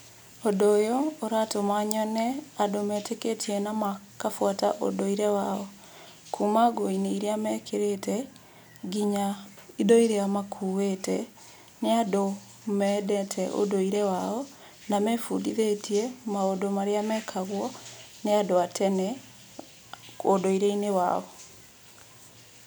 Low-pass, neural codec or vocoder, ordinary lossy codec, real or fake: none; none; none; real